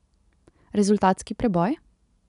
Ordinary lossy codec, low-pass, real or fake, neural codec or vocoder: none; 10.8 kHz; real; none